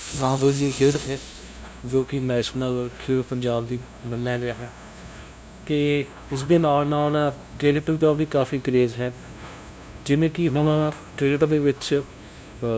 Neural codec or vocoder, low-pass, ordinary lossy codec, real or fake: codec, 16 kHz, 0.5 kbps, FunCodec, trained on LibriTTS, 25 frames a second; none; none; fake